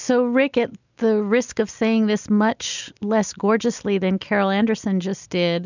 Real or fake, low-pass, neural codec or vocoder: real; 7.2 kHz; none